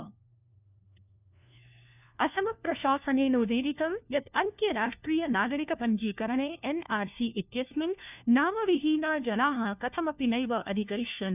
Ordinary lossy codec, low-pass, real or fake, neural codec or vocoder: none; 3.6 kHz; fake; codec, 16 kHz, 1 kbps, FunCodec, trained on LibriTTS, 50 frames a second